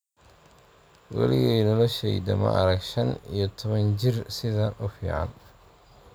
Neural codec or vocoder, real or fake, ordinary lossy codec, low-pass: none; real; none; none